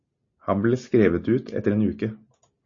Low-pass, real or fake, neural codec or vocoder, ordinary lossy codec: 7.2 kHz; real; none; MP3, 32 kbps